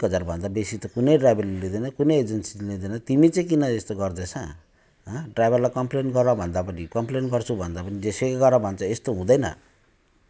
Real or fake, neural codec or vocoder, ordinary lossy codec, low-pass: real; none; none; none